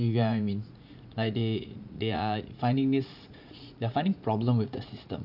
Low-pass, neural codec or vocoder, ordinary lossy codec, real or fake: 5.4 kHz; vocoder, 44.1 kHz, 80 mel bands, Vocos; none; fake